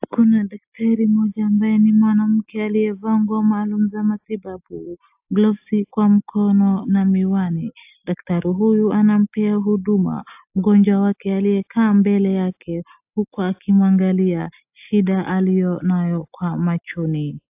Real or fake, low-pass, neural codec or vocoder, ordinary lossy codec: real; 3.6 kHz; none; AAC, 32 kbps